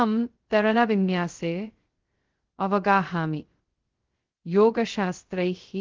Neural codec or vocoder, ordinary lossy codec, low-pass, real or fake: codec, 16 kHz, 0.2 kbps, FocalCodec; Opus, 16 kbps; 7.2 kHz; fake